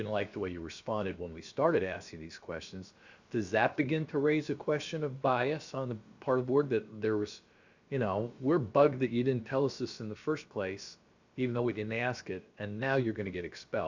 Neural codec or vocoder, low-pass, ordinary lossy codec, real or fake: codec, 16 kHz, about 1 kbps, DyCAST, with the encoder's durations; 7.2 kHz; Opus, 64 kbps; fake